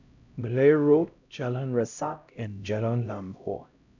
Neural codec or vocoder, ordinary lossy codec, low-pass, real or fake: codec, 16 kHz, 0.5 kbps, X-Codec, HuBERT features, trained on LibriSpeech; none; 7.2 kHz; fake